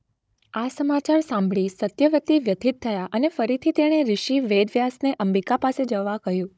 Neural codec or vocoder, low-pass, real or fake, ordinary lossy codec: codec, 16 kHz, 16 kbps, FunCodec, trained on Chinese and English, 50 frames a second; none; fake; none